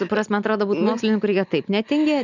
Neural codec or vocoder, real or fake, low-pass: none; real; 7.2 kHz